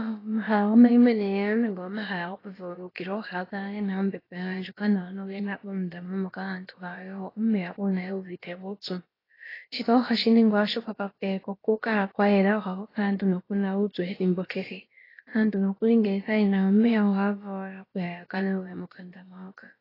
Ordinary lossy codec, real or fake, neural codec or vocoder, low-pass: AAC, 24 kbps; fake; codec, 16 kHz, about 1 kbps, DyCAST, with the encoder's durations; 5.4 kHz